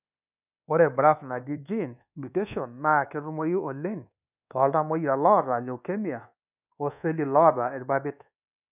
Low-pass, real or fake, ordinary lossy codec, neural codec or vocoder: 3.6 kHz; fake; none; codec, 24 kHz, 1.2 kbps, DualCodec